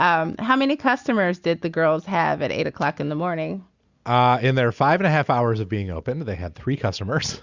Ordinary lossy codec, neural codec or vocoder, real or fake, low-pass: Opus, 64 kbps; none; real; 7.2 kHz